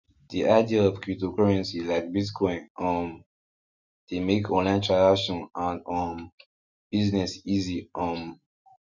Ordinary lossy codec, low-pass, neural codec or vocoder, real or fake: none; 7.2 kHz; none; real